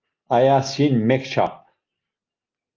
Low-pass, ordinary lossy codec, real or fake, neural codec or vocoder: 7.2 kHz; Opus, 24 kbps; real; none